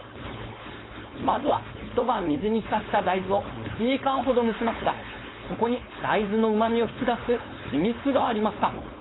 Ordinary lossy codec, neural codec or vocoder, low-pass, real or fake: AAC, 16 kbps; codec, 16 kHz, 4.8 kbps, FACodec; 7.2 kHz; fake